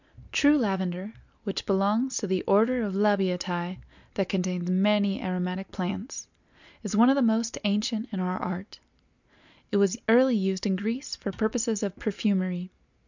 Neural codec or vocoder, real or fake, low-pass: none; real; 7.2 kHz